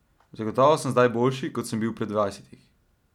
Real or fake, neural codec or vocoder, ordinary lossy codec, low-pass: real; none; none; 19.8 kHz